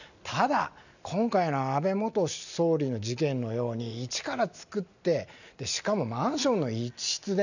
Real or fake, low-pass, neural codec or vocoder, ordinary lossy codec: real; 7.2 kHz; none; none